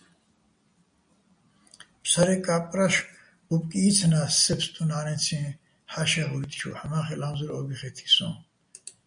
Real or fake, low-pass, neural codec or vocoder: real; 9.9 kHz; none